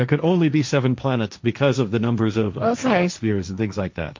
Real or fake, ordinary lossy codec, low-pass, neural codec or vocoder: fake; MP3, 48 kbps; 7.2 kHz; codec, 16 kHz, 1.1 kbps, Voila-Tokenizer